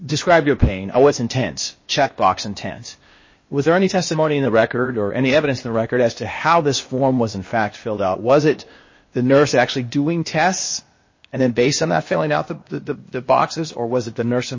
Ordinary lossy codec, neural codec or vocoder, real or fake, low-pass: MP3, 32 kbps; codec, 16 kHz, 0.8 kbps, ZipCodec; fake; 7.2 kHz